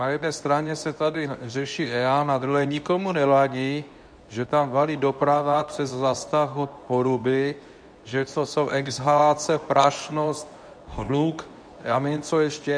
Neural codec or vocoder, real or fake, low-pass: codec, 24 kHz, 0.9 kbps, WavTokenizer, medium speech release version 2; fake; 9.9 kHz